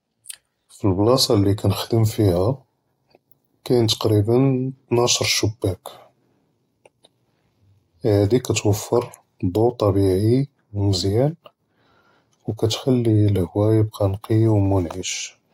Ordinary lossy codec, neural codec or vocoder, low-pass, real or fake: AAC, 48 kbps; none; 19.8 kHz; real